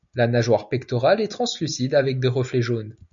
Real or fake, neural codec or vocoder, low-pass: real; none; 7.2 kHz